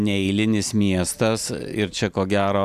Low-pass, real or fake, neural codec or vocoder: 14.4 kHz; real; none